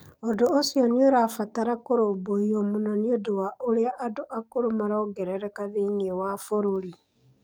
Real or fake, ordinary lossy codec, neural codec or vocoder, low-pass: fake; none; codec, 44.1 kHz, 7.8 kbps, DAC; none